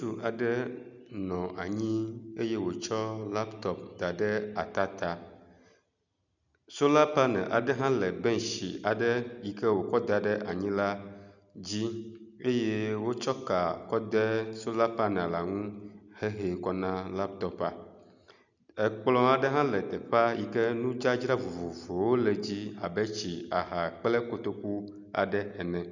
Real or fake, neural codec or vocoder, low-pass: real; none; 7.2 kHz